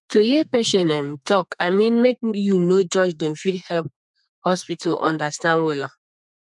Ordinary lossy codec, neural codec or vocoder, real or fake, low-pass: none; codec, 32 kHz, 1.9 kbps, SNAC; fake; 10.8 kHz